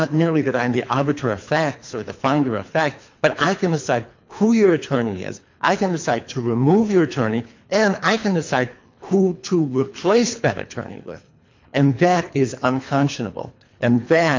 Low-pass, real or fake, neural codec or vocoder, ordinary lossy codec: 7.2 kHz; fake; codec, 24 kHz, 3 kbps, HILCodec; MP3, 64 kbps